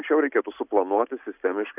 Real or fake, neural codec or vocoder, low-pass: real; none; 3.6 kHz